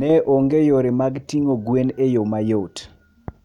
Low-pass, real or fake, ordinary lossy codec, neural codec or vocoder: 19.8 kHz; real; none; none